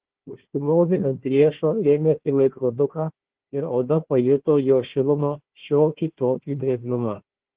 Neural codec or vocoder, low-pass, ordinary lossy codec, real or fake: codec, 16 kHz, 1 kbps, FunCodec, trained on Chinese and English, 50 frames a second; 3.6 kHz; Opus, 16 kbps; fake